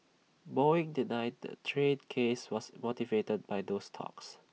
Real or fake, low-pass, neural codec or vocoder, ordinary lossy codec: real; none; none; none